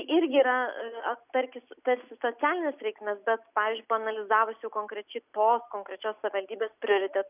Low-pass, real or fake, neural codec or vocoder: 3.6 kHz; real; none